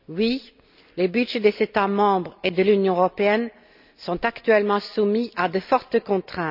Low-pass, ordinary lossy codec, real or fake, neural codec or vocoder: 5.4 kHz; none; real; none